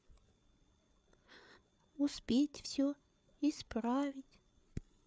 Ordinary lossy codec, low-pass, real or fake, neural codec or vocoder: none; none; fake; codec, 16 kHz, 8 kbps, FreqCodec, larger model